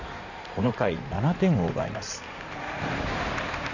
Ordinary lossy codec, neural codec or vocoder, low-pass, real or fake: none; codec, 16 kHz in and 24 kHz out, 2.2 kbps, FireRedTTS-2 codec; 7.2 kHz; fake